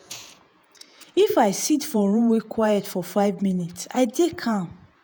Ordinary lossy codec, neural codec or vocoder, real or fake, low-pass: none; vocoder, 48 kHz, 128 mel bands, Vocos; fake; none